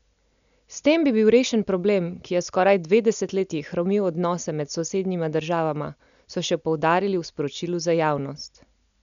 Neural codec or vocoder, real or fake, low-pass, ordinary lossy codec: none; real; 7.2 kHz; none